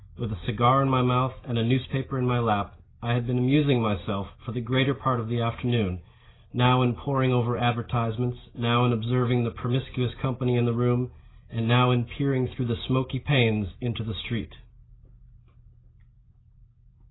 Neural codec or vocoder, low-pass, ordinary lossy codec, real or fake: none; 7.2 kHz; AAC, 16 kbps; real